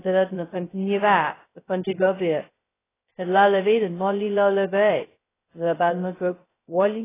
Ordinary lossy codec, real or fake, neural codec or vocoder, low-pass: AAC, 16 kbps; fake; codec, 16 kHz, 0.2 kbps, FocalCodec; 3.6 kHz